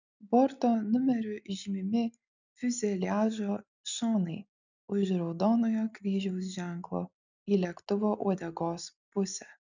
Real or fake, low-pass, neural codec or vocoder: real; 7.2 kHz; none